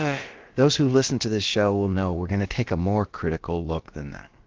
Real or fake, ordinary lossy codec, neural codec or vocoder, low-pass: fake; Opus, 16 kbps; codec, 16 kHz, about 1 kbps, DyCAST, with the encoder's durations; 7.2 kHz